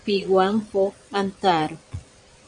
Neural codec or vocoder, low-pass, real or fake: vocoder, 22.05 kHz, 80 mel bands, Vocos; 9.9 kHz; fake